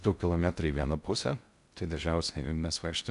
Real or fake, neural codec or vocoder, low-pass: fake; codec, 16 kHz in and 24 kHz out, 0.6 kbps, FocalCodec, streaming, 2048 codes; 10.8 kHz